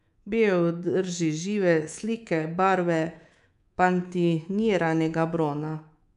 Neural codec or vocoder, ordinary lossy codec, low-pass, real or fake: codec, 24 kHz, 3.1 kbps, DualCodec; none; 10.8 kHz; fake